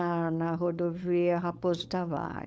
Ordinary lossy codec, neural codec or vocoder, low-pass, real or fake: none; codec, 16 kHz, 4.8 kbps, FACodec; none; fake